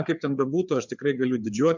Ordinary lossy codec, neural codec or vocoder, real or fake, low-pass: MP3, 64 kbps; codec, 16 kHz, 4 kbps, FreqCodec, larger model; fake; 7.2 kHz